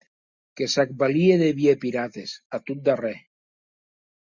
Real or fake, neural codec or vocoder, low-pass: real; none; 7.2 kHz